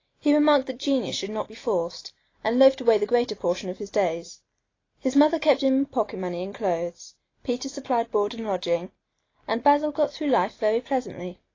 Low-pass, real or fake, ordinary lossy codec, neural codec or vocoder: 7.2 kHz; real; AAC, 32 kbps; none